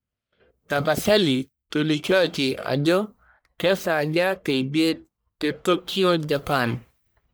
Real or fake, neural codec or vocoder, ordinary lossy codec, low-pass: fake; codec, 44.1 kHz, 1.7 kbps, Pupu-Codec; none; none